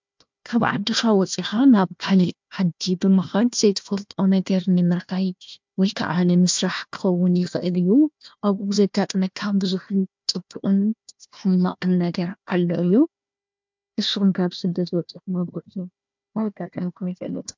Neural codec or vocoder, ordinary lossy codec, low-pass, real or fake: codec, 16 kHz, 1 kbps, FunCodec, trained on Chinese and English, 50 frames a second; MP3, 64 kbps; 7.2 kHz; fake